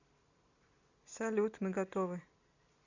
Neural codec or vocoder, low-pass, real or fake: none; 7.2 kHz; real